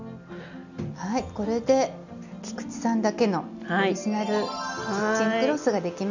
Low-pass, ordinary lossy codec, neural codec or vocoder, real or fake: 7.2 kHz; none; none; real